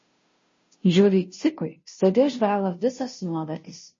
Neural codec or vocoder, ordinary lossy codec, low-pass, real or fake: codec, 16 kHz, 0.5 kbps, FunCodec, trained on Chinese and English, 25 frames a second; MP3, 32 kbps; 7.2 kHz; fake